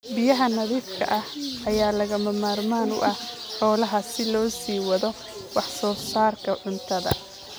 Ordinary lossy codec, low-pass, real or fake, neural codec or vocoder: none; none; real; none